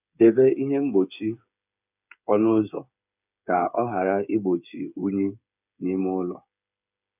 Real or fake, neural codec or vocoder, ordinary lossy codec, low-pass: fake; codec, 16 kHz, 8 kbps, FreqCodec, smaller model; none; 3.6 kHz